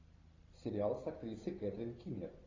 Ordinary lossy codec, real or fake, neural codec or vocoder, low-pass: AAC, 32 kbps; real; none; 7.2 kHz